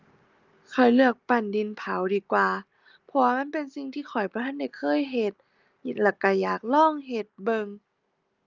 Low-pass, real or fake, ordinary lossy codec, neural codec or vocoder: 7.2 kHz; real; Opus, 24 kbps; none